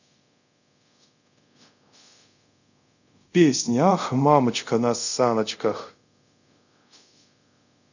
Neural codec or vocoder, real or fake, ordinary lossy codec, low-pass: codec, 24 kHz, 0.5 kbps, DualCodec; fake; none; 7.2 kHz